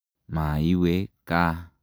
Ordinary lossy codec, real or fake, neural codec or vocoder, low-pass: none; real; none; none